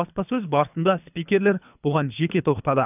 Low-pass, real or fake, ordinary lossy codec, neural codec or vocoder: 3.6 kHz; fake; none; codec, 24 kHz, 3 kbps, HILCodec